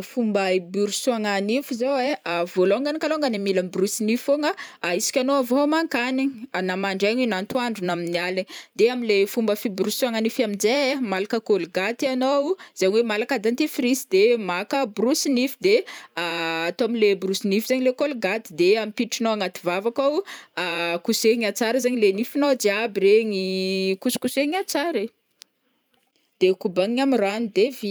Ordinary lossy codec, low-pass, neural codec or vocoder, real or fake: none; none; vocoder, 44.1 kHz, 128 mel bands every 512 samples, BigVGAN v2; fake